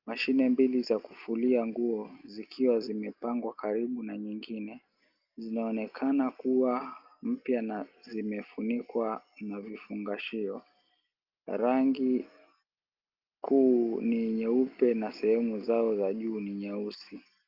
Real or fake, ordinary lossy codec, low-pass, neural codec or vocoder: real; Opus, 24 kbps; 5.4 kHz; none